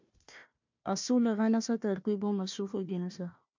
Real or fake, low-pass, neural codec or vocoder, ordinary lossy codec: fake; 7.2 kHz; codec, 16 kHz, 1 kbps, FunCodec, trained on Chinese and English, 50 frames a second; MP3, 64 kbps